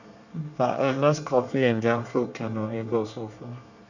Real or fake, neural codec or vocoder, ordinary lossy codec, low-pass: fake; codec, 24 kHz, 1 kbps, SNAC; none; 7.2 kHz